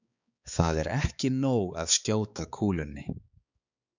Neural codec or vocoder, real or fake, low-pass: codec, 16 kHz, 4 kbps, X-Codec, HuBERT features, trained on balanced general audio; fake; 7.2 kHz